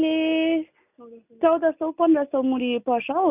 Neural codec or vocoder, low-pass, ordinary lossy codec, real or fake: none; 3.6 kHz; none; real